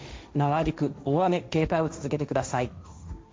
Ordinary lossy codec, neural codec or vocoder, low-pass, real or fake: none; codec, 16 kHz, 1.1 kbps, Voila-Tokenizer; none; fake